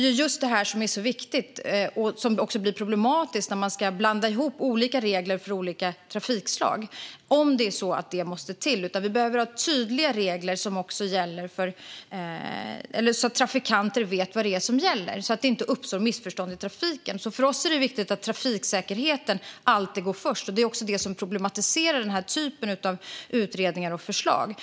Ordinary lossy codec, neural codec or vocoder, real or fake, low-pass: none; none; real; none